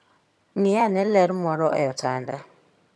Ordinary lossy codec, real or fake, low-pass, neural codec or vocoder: none; fake; none; vocoder, 22.05 kHz, 80 mel bands, HiFi-GAN